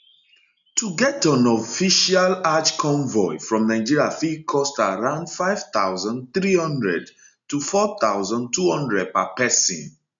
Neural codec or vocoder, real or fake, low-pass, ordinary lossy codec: none; real; 7.2 kHz; MP3, 96 kbps